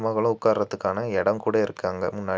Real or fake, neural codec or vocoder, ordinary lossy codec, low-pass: real; none; none; none